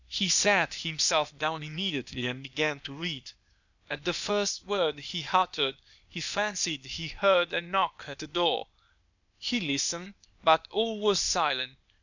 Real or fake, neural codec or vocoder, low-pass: fake; codec, 16 kHz, 0.8 kbps, ZipCodec; 7.2 kHz